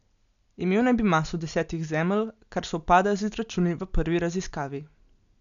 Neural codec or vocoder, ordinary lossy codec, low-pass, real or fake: none; none; 7.2 kHz; real